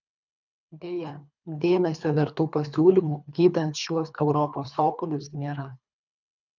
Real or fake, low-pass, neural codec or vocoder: fake; 7.2 kHz; codec, 24 kHz, 3 kbps, HILCodec